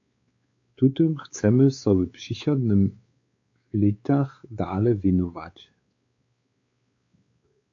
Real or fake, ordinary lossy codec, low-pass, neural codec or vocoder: fake; AAC, 48 kbps; 7.2 kHz; codec, 16 kHz, 4 kbps, X-Codec, WavLM features, trained on Multilingual LibriSpeech